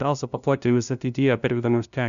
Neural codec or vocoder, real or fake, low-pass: codec, 16 kHz, 0.5 kbps, FunCodec, trained on LibriTTS, 25 frames a second; fake; 7.2 kHz